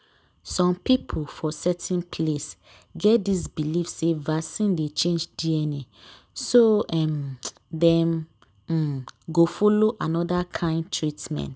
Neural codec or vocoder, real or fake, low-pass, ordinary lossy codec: none; real; none; none